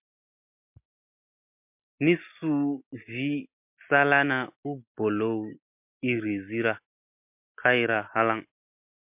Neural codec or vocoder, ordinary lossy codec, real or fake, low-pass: none; MP3, 32 kbps; real; 3.6 kHz